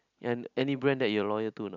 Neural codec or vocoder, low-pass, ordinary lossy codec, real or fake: none; 7.2 kHz; none; real